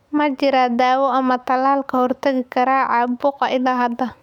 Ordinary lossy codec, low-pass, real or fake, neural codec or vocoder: none; 19.8 kHz; fake; autoencoder, 48 kHz, 128 numbers a frame, DAC-VAE, trained on Japanese speech